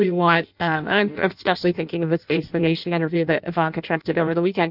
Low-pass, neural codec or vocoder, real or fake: 5.4 kHz; codec, 16 kHz in and 24 kHz out, 0.6 kbps, FireRedTTS-2 codec; fake